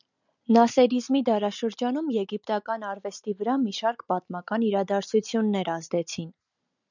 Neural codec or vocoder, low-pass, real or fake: none; 7.2 kHz; real